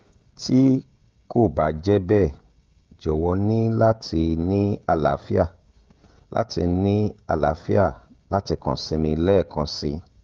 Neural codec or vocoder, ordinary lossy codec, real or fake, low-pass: codec, 16 kHz, 16 kbps, FreqCodec, smaller model; Opus, 32 kbps; fake; 7.2 kHz